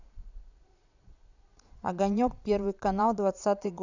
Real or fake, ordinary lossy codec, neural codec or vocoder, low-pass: fake; none; vocoder, 22.05 kHz, 80 mel bands, Vocos; 7.2 kHz